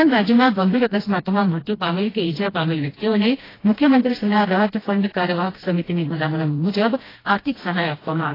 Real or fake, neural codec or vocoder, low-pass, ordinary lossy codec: fake; codec, 16 kHz, 1 kbps, FreqCodec, smaller model; 5.4 kHz; AAC, 24 kbps